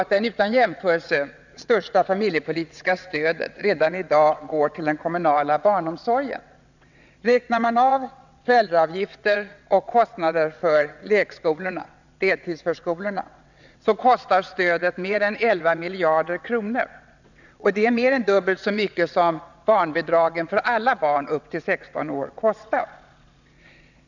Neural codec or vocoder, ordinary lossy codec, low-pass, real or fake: vocoder, 22.05 kHz, 80 mel bands, WaveNeXt; none; 7.2 kHz; fake